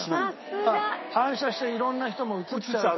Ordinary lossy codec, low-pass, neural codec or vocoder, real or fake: MP3, 24 kbps; 7.2 kHz; codec, 16 kHz, 6 kbps, DAC; fake